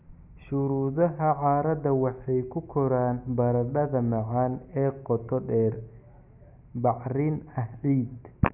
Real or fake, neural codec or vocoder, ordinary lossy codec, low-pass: real; none; none; 3.6 kHz